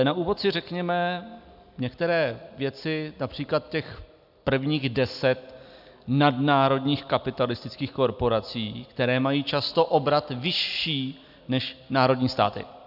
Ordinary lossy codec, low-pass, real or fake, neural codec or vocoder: AAC, 48 kbps; 5.4 kHz; real; none